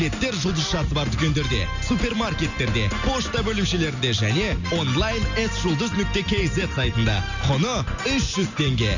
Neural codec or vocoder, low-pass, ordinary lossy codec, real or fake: none; 7.2 kHz; none; real